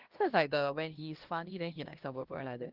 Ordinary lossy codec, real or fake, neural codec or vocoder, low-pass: Opus, 16 kbps; fake; codec, 16 kHz, 1 kbps, X-Codec, HuBERT features, trained on LibriSpeech; 5.4 kHz